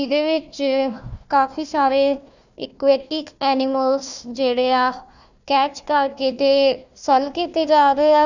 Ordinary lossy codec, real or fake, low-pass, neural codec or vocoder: none; fake; 7.2 kHz; codec, 16 kHz, 1 kbps, FunCodec, trained on Chinese and English, 50 frames a second